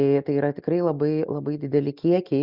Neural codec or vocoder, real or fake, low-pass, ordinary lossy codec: none; real; 5.4 kHz; Opus, 64 kbps